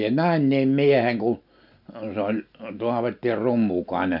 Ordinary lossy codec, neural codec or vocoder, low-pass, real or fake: none; none; 5.4 kHz; real